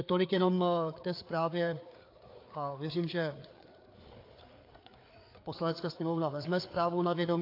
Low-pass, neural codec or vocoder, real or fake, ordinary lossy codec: 5.4 kHz; codec, 16 kHz, 4 kbps, FreqCodec, larger model; fake; AAC, 32 kbps